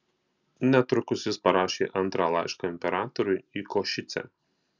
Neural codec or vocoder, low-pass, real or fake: none; 7.2 kHz; real